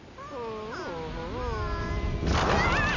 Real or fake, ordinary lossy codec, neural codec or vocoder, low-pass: real; none; none; 7.2 kHz